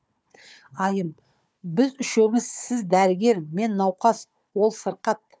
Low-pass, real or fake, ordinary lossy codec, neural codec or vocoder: none; fake; none; codec, 16 kHz, 4 kbps, FunCodec, trained on Chinese and English, 50 frames a second